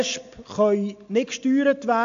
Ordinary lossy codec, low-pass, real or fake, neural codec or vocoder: AAC, 64 kbps; 7.2 kHz; real; none